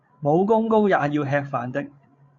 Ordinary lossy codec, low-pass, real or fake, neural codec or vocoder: AAC, 48 kbps; 7.2 kHz; fake; codec, 16 kHz, 16 kbps, FreqCodec, larger model